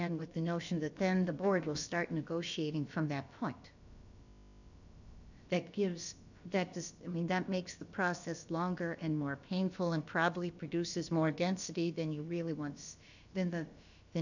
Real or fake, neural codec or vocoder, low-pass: fake; codec, 16 kHz, about 1 kbps, DyCAST, with the encoder's durations; 7.2 kHz